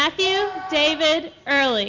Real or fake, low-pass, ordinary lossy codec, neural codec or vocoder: real; 7.2 kHz; Opus, 64 kbps; none